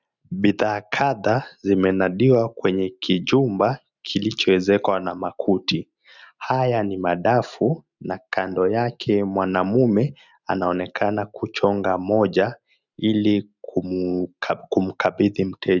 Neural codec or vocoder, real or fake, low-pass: none; real; 7.2 kHz